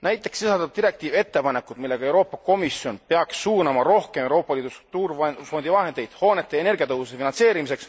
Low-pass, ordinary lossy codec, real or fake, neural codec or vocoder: none; none; real; none